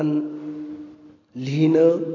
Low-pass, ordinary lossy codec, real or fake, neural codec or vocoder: 7.2 kHz; AAC, 32 kbps; real; none